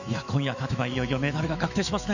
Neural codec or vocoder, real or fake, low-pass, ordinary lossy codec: autoencoder, 48 kHz, 128 numbers a frame, DAC-VAE, trained on Japanese speech; fake; 7.2 kHz; none